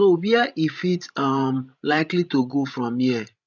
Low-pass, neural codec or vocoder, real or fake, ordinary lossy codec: 7.2 kHz; codec, 16 kHz, 16 kbps, FreqCodec, larger model; fake; none